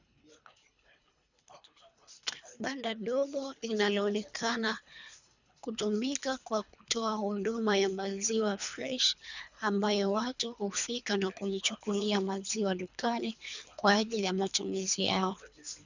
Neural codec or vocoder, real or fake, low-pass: codec, 24 kHz, 3 kbps, HILCodec; fake; 7.2 kHz